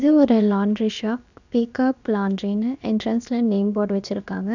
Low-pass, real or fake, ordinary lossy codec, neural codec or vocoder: 7.2 kHz; fake; none; codec, 16 kHz, about 1 kbps, DyCAST, with the encoder's durations